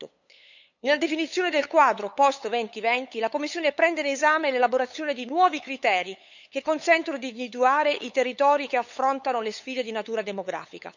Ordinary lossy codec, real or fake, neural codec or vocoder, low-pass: none; fake; codec, 16 kHz, 8 kbps, FunCodec, trained on LibriTTS, 25 frames a second; 7.2 kHz